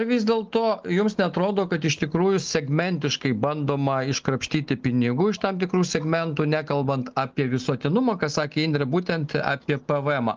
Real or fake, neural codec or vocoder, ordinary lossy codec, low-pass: real; none; Opus, 24 kbps; 7.2 kHz